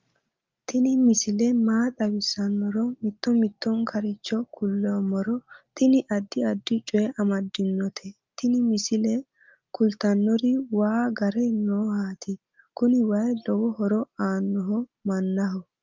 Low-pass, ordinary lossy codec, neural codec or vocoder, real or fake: 7.2 kHz; Opus, 24 kbps; none; real